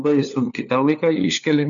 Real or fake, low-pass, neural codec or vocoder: fake; 7.2 kHz; codec, 16 kHz, 4 kbps, FunCodec, trained on LibriTTS, 50 frames a second